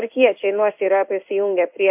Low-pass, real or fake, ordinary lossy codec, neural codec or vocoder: 3.6 kHz; fake; MP3, 32 kbps; codec, 16 kHz in and 24 kHz out, 1 kbps, XY-Tokenizer